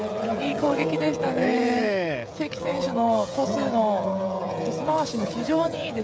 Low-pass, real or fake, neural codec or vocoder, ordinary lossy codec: none; fake; codec, 16 kHz, 16 kbps, FreqCodec, smaller model; none